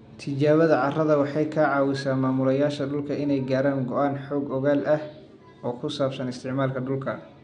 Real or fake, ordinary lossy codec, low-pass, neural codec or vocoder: real; none; 9.9 kHz; none